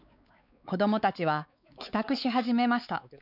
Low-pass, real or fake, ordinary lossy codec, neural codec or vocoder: 5.4 kHz; fake; none; codec, 16 kHz, 4 kbps, X-Codec, WavLM features, trained on Multilingual LibriSpeech